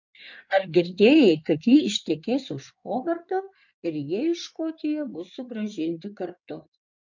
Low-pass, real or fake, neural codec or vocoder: 7.2 kHz; fake; codec, 16 kHz in and 24 kHz out, 2.2 kbps, FireRedTTS-2 codec